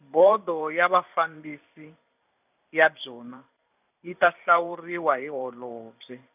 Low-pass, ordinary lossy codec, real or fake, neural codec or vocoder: 3.6 kHz; none; fake; vocoder, 44.1 kHz, 128 mel bands every 512 samples, BigVGAN v2